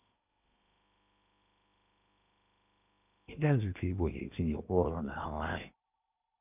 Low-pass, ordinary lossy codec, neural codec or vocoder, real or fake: 3.6 kHz; none; codec, 16 kHz in and 24 kHz out, 0.8 kbps, FocalCodec, streaming, 65536 codes; fake